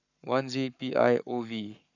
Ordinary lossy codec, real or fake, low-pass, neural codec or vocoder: none; fake; 7.2 kHz; codec, 44.1 kHz, 7.8 kbps, Pupu-Codec